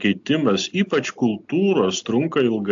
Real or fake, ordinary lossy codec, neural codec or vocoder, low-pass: real; AAC, 64 kbps; none; 7.2 kHz